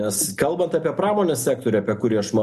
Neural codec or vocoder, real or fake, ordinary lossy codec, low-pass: none; real; MP3, 64 kbps; 14.4 kHz